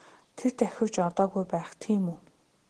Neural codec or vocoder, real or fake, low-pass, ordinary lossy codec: none; real; 10.8 kHz; Opus, 16 kbps